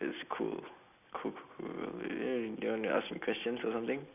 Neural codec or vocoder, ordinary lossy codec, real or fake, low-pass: none; none; real; 3.6 kHz